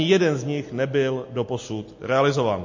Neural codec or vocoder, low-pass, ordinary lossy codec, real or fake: none; 7.2 kHz; MP3, 32 kbps; real